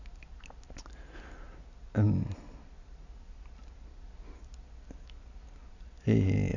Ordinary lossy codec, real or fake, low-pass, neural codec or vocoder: none; real; 7.2 kHz; none